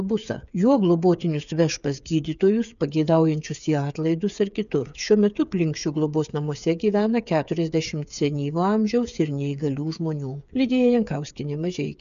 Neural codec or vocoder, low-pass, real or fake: codec, 16 kHz, 8 kbps, FreqCodec, smaller model; 7.2 kHz; fake